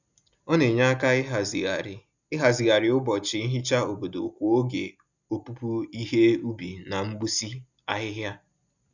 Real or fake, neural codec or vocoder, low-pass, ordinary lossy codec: real; none; 7.2 kHz; none